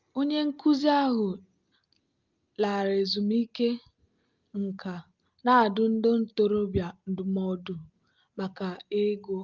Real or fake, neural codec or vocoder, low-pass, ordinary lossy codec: real; none; 7.2 kHz; Opus, 32 kbps